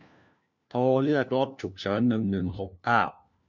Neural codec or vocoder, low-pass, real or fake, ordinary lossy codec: codec, 16 kHz, 1 kbps, FunCodec, trained on LibriTTS, 50 frames a second; 7.2 kHz; fake; none